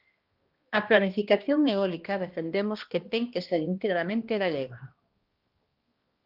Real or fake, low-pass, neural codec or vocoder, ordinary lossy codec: fake; 5.4 kHz; codec, 16 kHz, 1 kbps, X-Codec, HuBERT features, trained on balanced general audio; Opus, 32 kbps